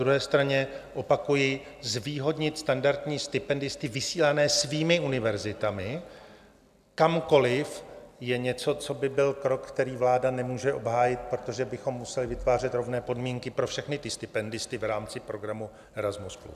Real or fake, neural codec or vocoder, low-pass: real; none; 14.4 kHz